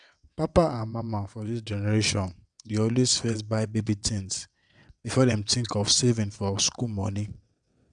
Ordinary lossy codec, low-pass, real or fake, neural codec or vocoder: none; 9.9 kHz; fake; vocoder, 22.05 kHz, 80 mel bands, WaveNeXt